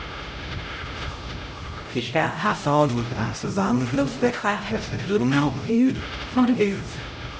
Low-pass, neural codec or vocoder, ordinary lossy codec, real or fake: none; codec, 16 kHz, 0.5 kbps, X-Codec, HuBERT features, trained on LibriSpeech; none; fake